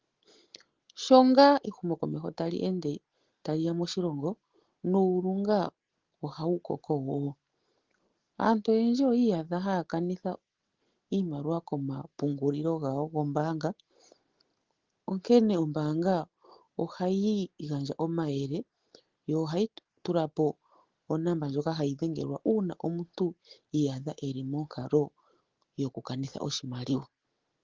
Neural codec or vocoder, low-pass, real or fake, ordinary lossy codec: none; 7.2 kHz; real; Opus, 16 kbps